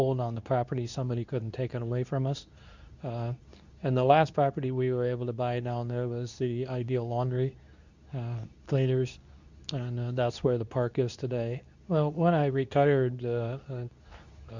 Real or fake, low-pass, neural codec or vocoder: fake; 7.2 kHz; codec, 24 kHz, 0.9 kbps, WavTokenizer, medium speech release version 2